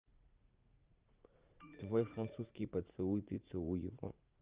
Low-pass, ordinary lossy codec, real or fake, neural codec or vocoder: 3.6 kHz; Opus, 32 kbps; fake; vocoder, 44.1 kHz, 80 mel bands, Vocos